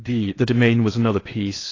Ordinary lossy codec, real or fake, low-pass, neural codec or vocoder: AAC, 32 kbps; fake; 7.2 kHz; codec, 16 kHz in and 24 kHz out, 0.8 kbps, FocalCodec, streaming, 65536 codes